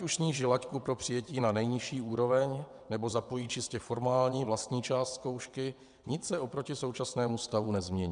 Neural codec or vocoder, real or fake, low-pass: vocoder, 22.05 kHz, 80 mel bands, WaveNeXt; fake; 9.9 kHz